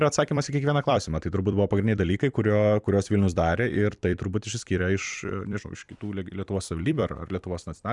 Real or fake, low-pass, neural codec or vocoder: fake; 10.8 kHz; vocoder, 44.1 kHz, 128 mel bands every 256 samples, BigVGAN v2